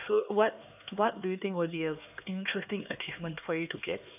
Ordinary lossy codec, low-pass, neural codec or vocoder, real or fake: none; 3.6 kHz; codec, 16 kHz, 2 kbps, X-Codec, HuBERT features, trained on LibriSpeech; fake